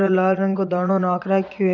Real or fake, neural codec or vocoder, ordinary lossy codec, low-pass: fake; vocoder, 22.05 kHz, 80 mel bands, Vocos; none; 7.2 kHz